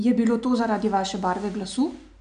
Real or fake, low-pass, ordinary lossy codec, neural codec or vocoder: real; 9.9 kHz; AAC, 96 kbps; none